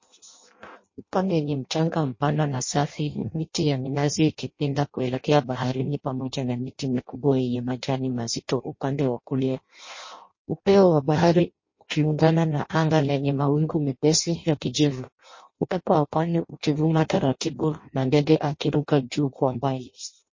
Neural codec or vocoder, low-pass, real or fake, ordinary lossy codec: codec, 16 kHz in and 24 kHz out, 0.6 kbps, FireRedTTS-2 codec; 7.2 kHz; fake; MP3, 32 kbps